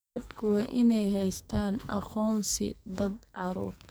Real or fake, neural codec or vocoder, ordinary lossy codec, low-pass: fake; codec, 44.1 kHz, 2.6 kbps, SNAC; none; none